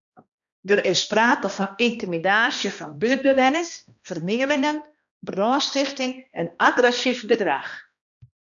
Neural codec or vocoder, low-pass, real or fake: codec, 16 kHz, 1 kbps, X-Codec, HuBERT features, trained on balanced general audio; 7.2 kHz; fake